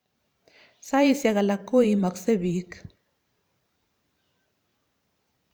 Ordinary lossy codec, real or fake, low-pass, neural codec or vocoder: none; fake; none; vocoder, 44.1 kHz, 128 mel bands every 512 samples, BigVGAN v2